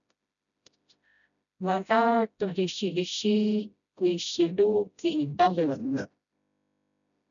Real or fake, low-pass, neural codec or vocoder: fake; 7.2 kHz; codec, 16 kHz, 0.5 kbps, FreqCodec, smaller model